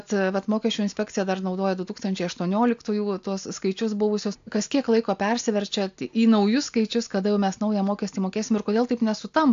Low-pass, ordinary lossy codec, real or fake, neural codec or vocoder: 7.2 kHz; AAC, 64 kbps; real; none